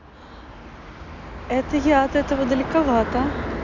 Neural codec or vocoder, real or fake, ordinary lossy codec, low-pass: none; real; none; 7.2 kHz